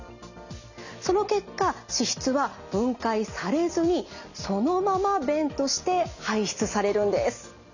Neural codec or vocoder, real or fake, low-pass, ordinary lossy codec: none; real; 7.2 kHz; none